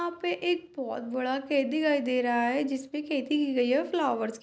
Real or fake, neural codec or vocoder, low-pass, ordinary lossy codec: real; none; none; none